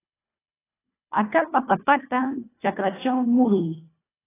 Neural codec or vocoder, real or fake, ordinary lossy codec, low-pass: codec, 24 kHz, 1.5 kbps, HILCodec; fake; AAC, 16 kbps; 3.6 kHz